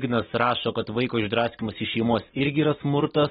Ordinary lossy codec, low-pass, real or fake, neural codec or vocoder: AAC, 16 kbps; 7.2 kHz; real; none